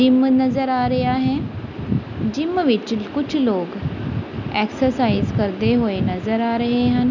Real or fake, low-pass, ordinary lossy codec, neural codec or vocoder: real; 7.2 kHz; none; none